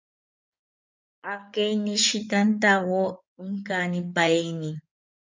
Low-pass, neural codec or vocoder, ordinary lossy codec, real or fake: 7.2 kHz; codec, 16 kHz in and 24 kHz out, 2.2 kbps, FireRedTTS-2 codec; MP3, 64 kbps; fake